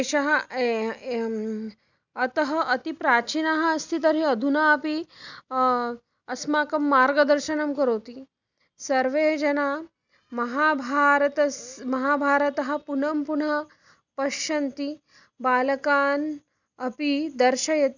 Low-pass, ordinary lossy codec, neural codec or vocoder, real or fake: 7.2 kHz; none; none; real